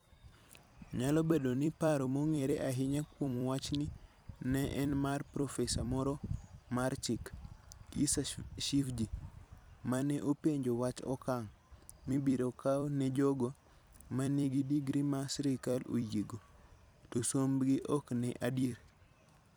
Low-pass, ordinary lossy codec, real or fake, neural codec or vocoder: none; none; fake; vocoder, 44.1 kHz, 128 mel bands every 256 samples, BigVGAN v2